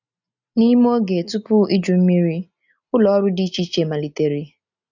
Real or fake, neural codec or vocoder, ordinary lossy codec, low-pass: real; none; none; 7.2 kHz